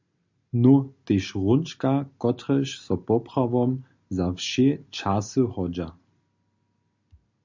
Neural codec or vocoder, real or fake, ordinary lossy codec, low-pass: none; real; MP3, 64 kbps; 7.2 kHz